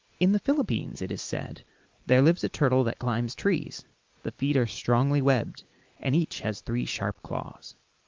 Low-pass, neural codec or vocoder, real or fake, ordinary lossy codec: 7.2 kHz; none; real; Opus, 24 kbps